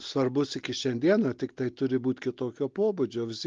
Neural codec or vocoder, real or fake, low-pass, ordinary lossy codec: none; real; 7.2 kHz; Opus, 32 kbps